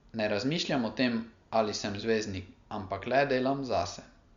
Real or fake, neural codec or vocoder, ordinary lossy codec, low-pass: real; none; Opus, 64 kbps; 7.2 kHz